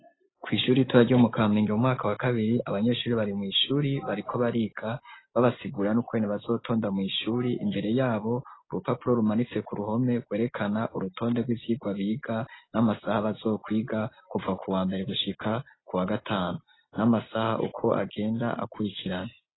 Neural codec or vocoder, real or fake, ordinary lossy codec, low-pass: none; real; AAC, 16 kbps; 7.2 kHz